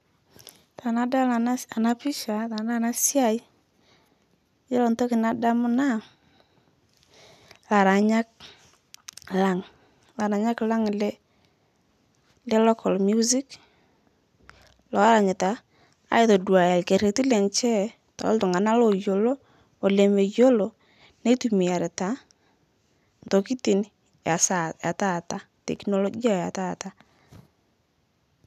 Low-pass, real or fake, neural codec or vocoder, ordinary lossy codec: 14.4 kHz; real; none; none